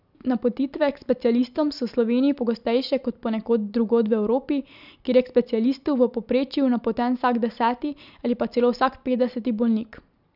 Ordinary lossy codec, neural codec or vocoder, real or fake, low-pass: none; none; real; 5.4 kHz